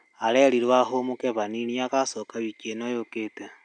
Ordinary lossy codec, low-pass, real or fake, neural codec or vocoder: none; 9.9 kHz; real; none